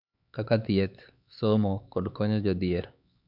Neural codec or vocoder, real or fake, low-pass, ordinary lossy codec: codec, 16 kHz, 4 kbps, X-Codec, HuBERT features, trained on LibriSpeech; fake; 5.4 kHz; none